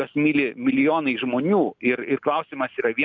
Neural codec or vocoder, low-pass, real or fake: none; 7.2 kHz; real